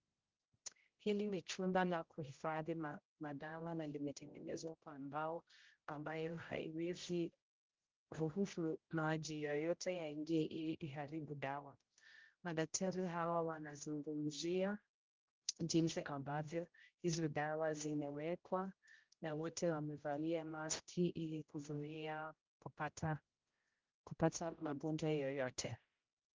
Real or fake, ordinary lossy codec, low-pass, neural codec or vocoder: fake; Opus, 32 kbps; 7.2 kHz; codec, 16 kHz, 0.5 kbps, X-Codec, HuBERT features, trained on general audio